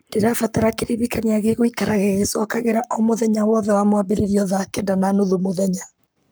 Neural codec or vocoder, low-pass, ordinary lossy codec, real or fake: codec, 44.1 kHz, 7.8 kbps, Pupu-Codec; none; none; fake